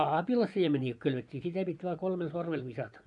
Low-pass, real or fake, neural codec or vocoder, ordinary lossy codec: 10.8 kHz; fake; vocoder, 24 kHz, 100 mel bands, Vocos; none